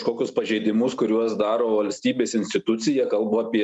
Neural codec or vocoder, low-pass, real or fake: none; 10.8 kHz; real